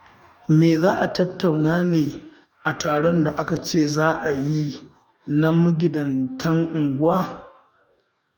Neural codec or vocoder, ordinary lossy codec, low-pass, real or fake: codec, 44.1 kHz, 2.6 kbps, DAC; MP3, 96 kbps; 19.8 kHz; fake